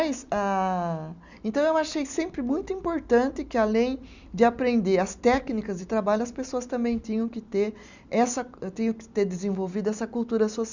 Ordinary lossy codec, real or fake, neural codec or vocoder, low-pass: none; real; none; 7.2 kHz